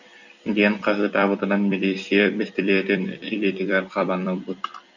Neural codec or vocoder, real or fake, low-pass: vocoder, 44.1 kHz, 128 mel bands every 256 samples, BigVGAN v2; fake; 7.2 kHz